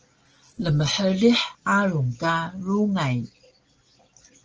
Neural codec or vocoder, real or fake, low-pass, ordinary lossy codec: none; real; 7.2 kHz; Opus, 16 kbps